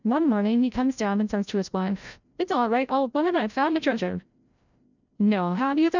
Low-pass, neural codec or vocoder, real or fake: 7.2 kHz; codec, 16 kHz, 0.5 kbps, FreqCodec, larger model; fake